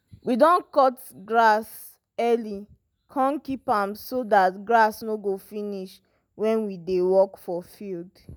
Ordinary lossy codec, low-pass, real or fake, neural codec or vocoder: none; none; real; none